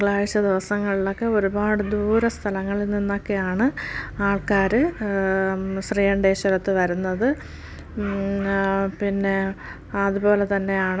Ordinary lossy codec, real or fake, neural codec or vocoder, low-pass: none; real; none; none